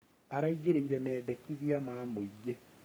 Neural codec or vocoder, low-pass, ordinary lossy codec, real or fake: codec, 44.1 kHz, 3.4 kbps, Pupu-Codec; none; none; fake